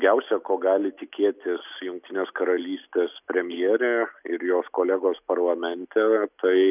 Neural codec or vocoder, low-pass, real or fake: none; 3.6 kHz; real